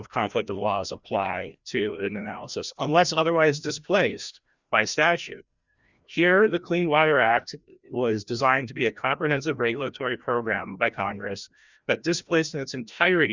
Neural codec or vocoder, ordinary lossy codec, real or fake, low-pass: codec, 16 kHz, 1 kbps, FreqCodec, larger model; Opus, 64 kbps; fake; 7.2 kHz